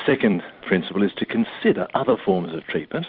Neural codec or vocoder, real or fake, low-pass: vocoder, 44.1 kHz, 128 mel bands every 256 samples, BigVGAN v2; fake; 5.4 kHz